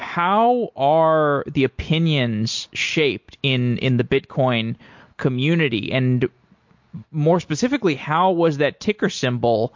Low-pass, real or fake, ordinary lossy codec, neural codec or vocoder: 7.2 kHz; real; MP3, 48 kbps; none